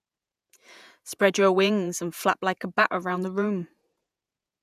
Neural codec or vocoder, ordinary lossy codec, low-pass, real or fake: vocoder, 48 kHz, 128 mel bands, Vocos; none; 14.4 kHz; fake